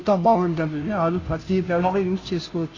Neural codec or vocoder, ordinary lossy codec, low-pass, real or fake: codec, 16 kHz, 0.5 kbps, FunCodec, trained on Chinese and English, 25 frames a second; AAC, 32 kbps; 7.2 kHz; fake